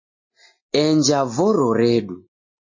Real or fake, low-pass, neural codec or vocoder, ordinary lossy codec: real; 7.2 kHz; none; MP3, 32 kbps